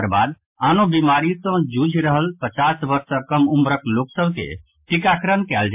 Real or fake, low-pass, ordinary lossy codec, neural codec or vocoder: real; 3.6 kHz; MP3, 32 kbps; none